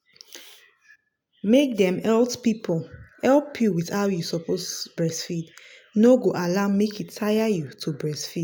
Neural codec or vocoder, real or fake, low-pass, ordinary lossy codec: none; real; none; none